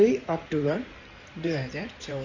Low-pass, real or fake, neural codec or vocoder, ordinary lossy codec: 7.2 kHz; fake; codec, 16 kHz in and 24 kHz out, 2.2 kbps, FireRedTTS-2 codec; none